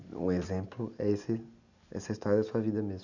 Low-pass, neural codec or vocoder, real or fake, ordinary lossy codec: 7.2 kHz; none; real; none